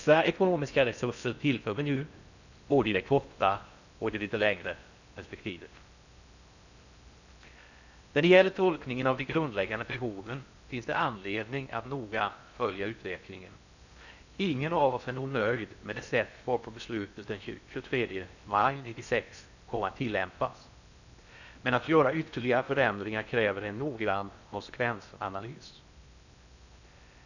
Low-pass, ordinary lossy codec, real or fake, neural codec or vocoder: 7.2 kHz; none; fake; codec, 16 kHz in and 24 kHz out, 0.6 kbps, FocalCodec, streaming, 4096 codes